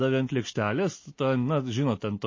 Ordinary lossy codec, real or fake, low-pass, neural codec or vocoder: MP3, 32 kbps; real; 7.2 kHz; none